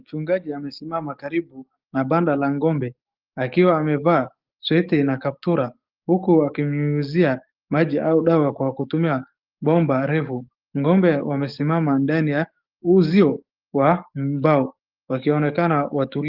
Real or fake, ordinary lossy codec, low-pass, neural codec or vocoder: fake; Opus, 32 kbps; 5.4 kHz; codec, 44.1 kHz, 7.8 kbps, DAC